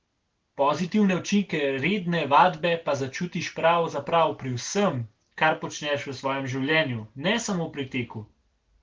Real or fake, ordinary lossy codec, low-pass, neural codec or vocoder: real; Opus, 16 kbps; 7.2 kHz; none